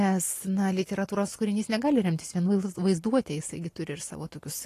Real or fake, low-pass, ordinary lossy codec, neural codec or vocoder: real; 14.4 kHz; AAC, 48 kbps; none